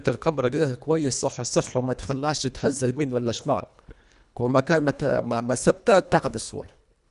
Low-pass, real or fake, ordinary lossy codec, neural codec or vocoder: 10.8 kHz; fake; none; codec, 24 kHz, 1.5 kbps, HILCodec